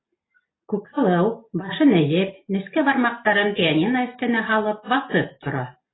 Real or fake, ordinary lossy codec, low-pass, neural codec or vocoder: real; AAC, 16 kbps; 7.2 kHz; none